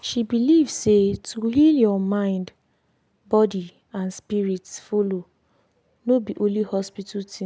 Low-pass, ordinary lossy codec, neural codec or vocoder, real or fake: none; none; none; real